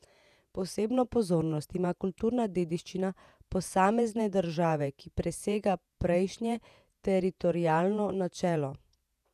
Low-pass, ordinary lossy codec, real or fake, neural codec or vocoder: 14.4 kHz; none; fake; vocoder, 48 kHz, 128 mel bands, Vocos